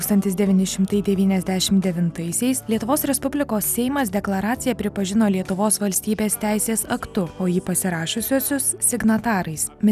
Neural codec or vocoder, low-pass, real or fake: none; 14.4 kHz; real